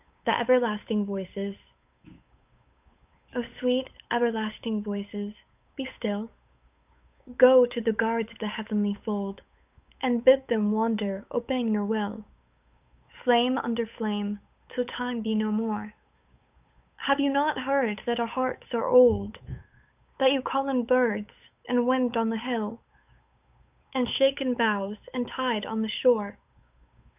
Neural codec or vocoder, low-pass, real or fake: codec, 16 kHz, 8 kbps, FunCodec, trained on Chinese and English, 25 frames a second; 3.6 kHz; fake